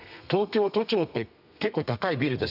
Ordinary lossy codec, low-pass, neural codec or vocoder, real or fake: none; 5.4 kHz; codec, 32 kHz, 1.9 kbps, SNAC; fake